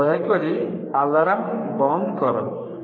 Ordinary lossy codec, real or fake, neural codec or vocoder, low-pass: AAC, 32 kbps; fake; codec, 44.1 kHz, 3.4 kbps, Pupu-Codec; 7.2 kHz